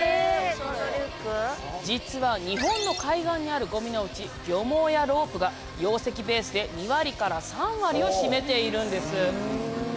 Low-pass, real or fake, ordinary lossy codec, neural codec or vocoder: none; real; none; none